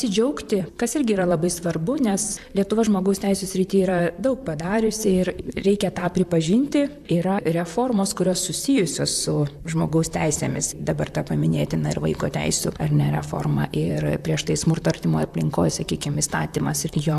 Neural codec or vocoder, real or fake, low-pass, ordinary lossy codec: vocoder, 44.1 kHz, 128 mel bands, Pupu-Vocoder; fake; 14.4 kHz; AAC, 96 kbps